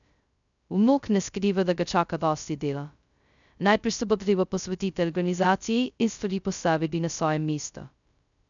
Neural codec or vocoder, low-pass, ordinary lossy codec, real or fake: codec, 16 kHz, 0.2 kbps, FocalCodec; 7.2 kHz; none; fake